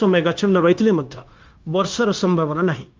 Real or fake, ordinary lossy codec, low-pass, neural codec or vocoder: fake; Opus, 24 kbps; 7.2 kHz; codec, 16 kHz, 0.9 kbps, LongCat-Audio-Codec